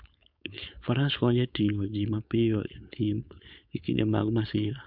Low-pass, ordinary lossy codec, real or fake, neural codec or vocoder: 5.4 kHz; none; fake; codec, 16 kHz, 4.8 kbps, FACodec